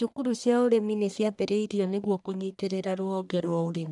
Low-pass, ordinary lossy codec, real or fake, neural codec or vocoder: 10.8 kHz; none; fake; codec, 44.1 kHz, 1.7 kbps, Pupu-Codec